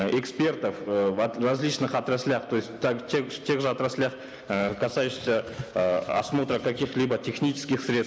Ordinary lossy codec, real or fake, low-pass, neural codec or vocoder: none; real; none; none